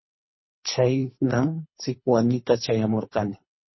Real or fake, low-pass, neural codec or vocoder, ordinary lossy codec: fake; 7.2 kHz; codec, 24 kHz, 3 kbps, HILCodec; MP3, 24 kbps